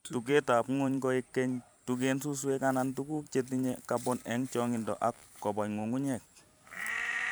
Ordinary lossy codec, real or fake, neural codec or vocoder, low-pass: none; fake; vocoder, 44.1 kHz, 128 mel bands every 512 samples, BigVGAN v2; none